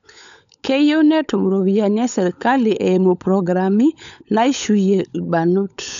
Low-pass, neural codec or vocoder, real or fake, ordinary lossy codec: 7.2 kHz; codec, 16 kHz, 16 kbps, FunCodec, trained on LibriTTS, 50 frames a second; fake; none